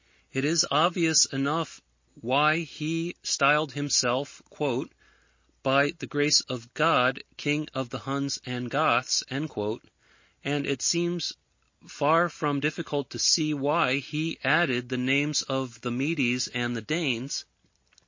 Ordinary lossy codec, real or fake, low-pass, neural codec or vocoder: MP3, 32 kbps; real; 7.2 kHz; none